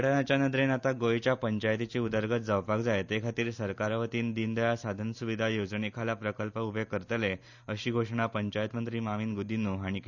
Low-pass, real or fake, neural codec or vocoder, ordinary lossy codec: 7.2 kHz; real; none; none